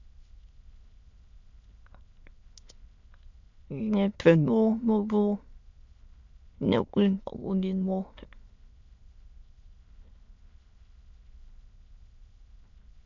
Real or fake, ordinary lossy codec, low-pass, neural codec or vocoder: fake; MP3, 64 kbps; 7.2 kHz; autoencoder, 22.05 kHz, a latent of 192 numbers a frame, VITS, trained on many speakers